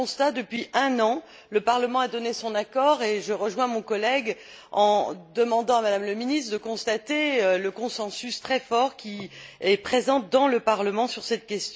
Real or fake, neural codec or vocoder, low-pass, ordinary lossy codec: real; none; none; none